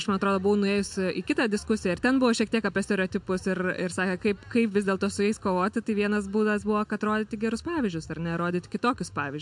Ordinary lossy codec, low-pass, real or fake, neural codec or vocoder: MP3, 64 kbps; 10.8 kHz; real; none